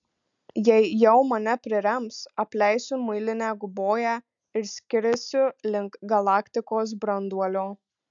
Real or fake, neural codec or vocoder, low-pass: real; none; 7.2 kHz